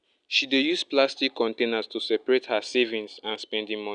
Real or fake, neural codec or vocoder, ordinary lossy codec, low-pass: real; none; none; 9.9 kHz